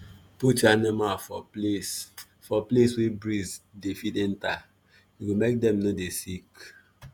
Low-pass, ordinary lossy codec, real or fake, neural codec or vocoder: none; none; fake; vocoder, 48 kHz, 128 mel bands, Vocos